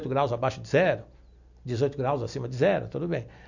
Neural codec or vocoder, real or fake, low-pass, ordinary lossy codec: none; real; 7.2 kHz; none